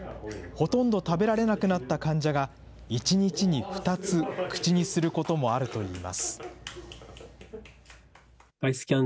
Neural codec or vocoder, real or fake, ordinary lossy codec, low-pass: none; real; none; none